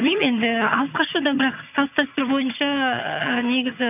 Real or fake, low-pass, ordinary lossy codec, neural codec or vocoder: fake; 3.6 kHz; none; vocoder, 22.05 kHz, 80 mel bands, HiFi-GAN